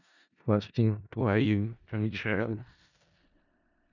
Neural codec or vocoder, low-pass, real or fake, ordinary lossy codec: codec, 16 kHz in and 24 kHz out, 0.4 kbps, LongCat-Audio-Codec, four codebook decoder; 7.2 kHz; fake; none